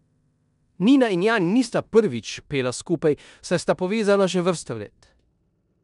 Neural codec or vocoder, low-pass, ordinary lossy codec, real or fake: codec, 16 kHz in and 24 kHz out, 0.9 kbps, LongCat-Audio-Codec, four codebook decoder; 10.8 kHz; none; fake